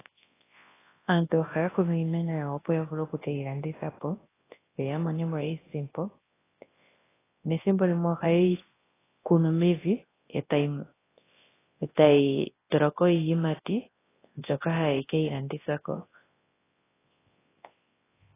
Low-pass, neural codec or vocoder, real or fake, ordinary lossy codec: 3.6 kHz; codec, 24 kHz, 0.9 kbps, WavTokenizer, large speech release; fake; AAC, 16 kbps